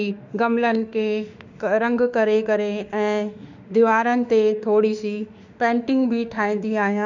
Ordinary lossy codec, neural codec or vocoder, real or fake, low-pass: none; autoencoder, 48 kHz, 32 numbers a frame, DAC-VAE, trained on Japanese speech; fake; 7.2 kHz